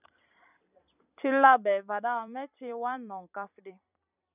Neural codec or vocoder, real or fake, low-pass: vocoder, 44.1 kHz, 128 mel bands every 256 samples, BigVGAN v2; fake; 3.6 kHz